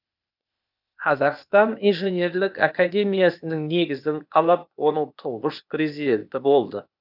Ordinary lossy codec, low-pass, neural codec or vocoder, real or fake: none; 5.4 kHz; codec, 16 kHz, 0.8 kbps, ZipCodec; fake